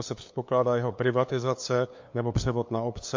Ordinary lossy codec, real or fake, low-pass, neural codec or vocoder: MP3, 48 kbps; fake; 7.2 kHz; codec, 16 kHz, 2 kbps, FunCodec, trained on LibriTTS, 25 frames a second